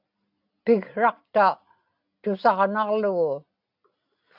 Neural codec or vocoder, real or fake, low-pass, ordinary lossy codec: none; real; 5.4 kHz; AAC, 48 kbps